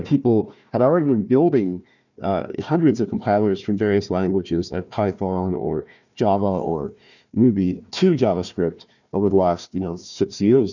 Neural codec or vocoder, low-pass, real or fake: codec, 16 kHz, 1 kbps, FunCodec, trained on Chinese and English, 50 frames a second; 7.2 kHz; fake